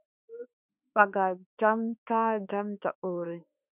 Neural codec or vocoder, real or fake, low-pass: autoencoder, 48 kHz, 32 numbers a frame, DAC-VAE, trained on Japanese speech; fake; 3.6 kHz